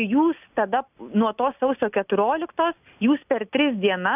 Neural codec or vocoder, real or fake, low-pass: none; real; 3.6 kHz